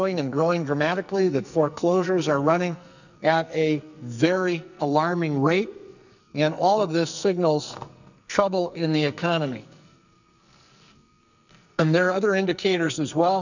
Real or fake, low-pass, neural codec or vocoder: fake; 7.2 kHz; codec, 44.1 kHz, 2.6 kbps, SNAC